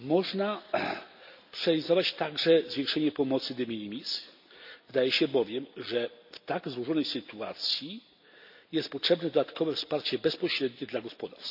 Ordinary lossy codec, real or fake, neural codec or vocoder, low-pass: none; real; none; 5.4 kHz